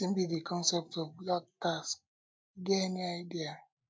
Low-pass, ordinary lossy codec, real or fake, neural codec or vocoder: none; none; real; none